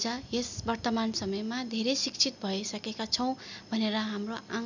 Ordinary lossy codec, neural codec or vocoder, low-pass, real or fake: none; none; 7.2 kHz; real